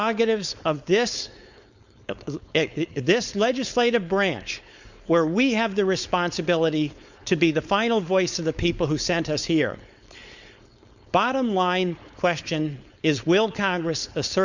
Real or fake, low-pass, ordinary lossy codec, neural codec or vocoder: fake; 7.2 kHz; Opus, 64 kbps; codec, 16 kHz, 4.8 kbps, FACodec